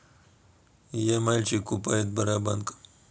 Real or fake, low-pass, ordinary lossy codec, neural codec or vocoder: real; none; none; none